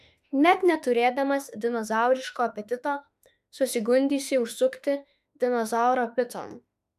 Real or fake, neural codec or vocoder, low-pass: fake; autoencoder, 48 kHz, 32 numbers a frame, DAC-VAE, trained on Japanese speech; 14.4 kHz